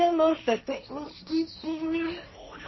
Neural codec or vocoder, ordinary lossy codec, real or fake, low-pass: codec, 16 kHz, 1.1 kbps, Voila-Tokenizer; MP3, 24 kbps; fake; 7.2 kHz